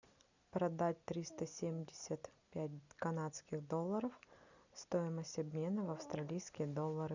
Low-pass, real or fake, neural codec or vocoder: 7.2 kHz; real; none